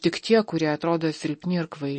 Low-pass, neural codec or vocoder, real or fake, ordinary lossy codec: 10.8 kHz; none; real; MP3, 32 kbps